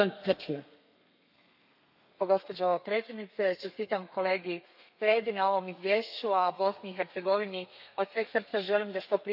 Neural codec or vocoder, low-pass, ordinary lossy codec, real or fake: codec, 32 kHz, 1.9 kbps, SNAC; 5.4 kHz; none; fake